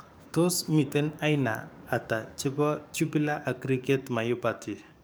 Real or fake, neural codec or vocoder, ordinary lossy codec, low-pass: fake; codec, 44.1 kHz, 7.8 kbps, DAC; none; none